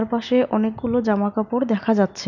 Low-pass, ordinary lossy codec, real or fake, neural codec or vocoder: 7.2 kHz; none; real; none